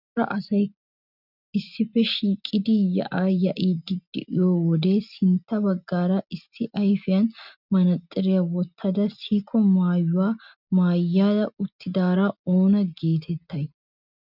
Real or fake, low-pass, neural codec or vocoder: real; 5.4 kHz; none